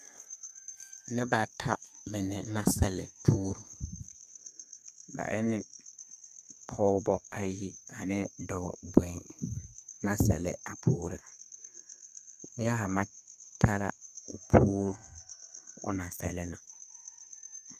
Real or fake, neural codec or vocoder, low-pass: fake; codec, 32 kHz, 1.9 kbps, SNAC; 14.4 kHz